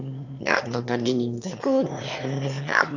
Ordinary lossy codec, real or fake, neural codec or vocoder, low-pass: none; fake; autoencoder, 22.05 kHz, a latent of 192 numbers a frame, VITS, trained on one speaker; 7.2 kHz